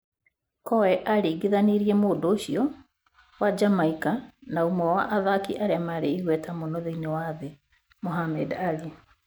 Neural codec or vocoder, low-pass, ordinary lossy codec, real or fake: none; none; none; real